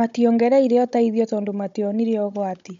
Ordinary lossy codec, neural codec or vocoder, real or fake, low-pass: MP3, 64 kbps; codec, 16 kHz, 16 kbps, FunCodec, trained on Chinese and English, 50 frames a second; fake; 7.2 kHz